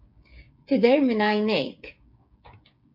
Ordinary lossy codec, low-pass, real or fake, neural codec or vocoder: MP3, 48 kbps; 5.4 kHz; fake; codec, 16 kHz, 8 kbps, FreqCodec, smaller model